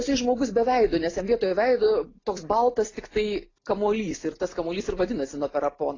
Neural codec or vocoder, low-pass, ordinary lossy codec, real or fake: none; 7.2 kHz; AAC, 32 kbps; real